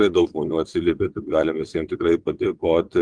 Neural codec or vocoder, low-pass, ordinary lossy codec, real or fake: vocoder, 22.05 kHz, 80 mel bands, WaveNeXt; 9.9 kHz; Opus, 24 kbps; fake